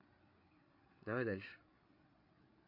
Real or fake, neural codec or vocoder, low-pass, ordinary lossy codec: fake; codec, 16 kHz, 8 kbps, FreqCodec, larger model; 5.4 kHz; MP3, 32 kbps